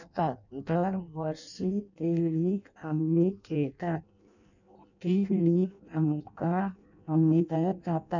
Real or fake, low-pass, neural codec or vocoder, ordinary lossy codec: fake; 7.2 kHz; codec, 16 kHz in and 24 kHz out, 0.6 kbps, FireRedTTS-2 codec; none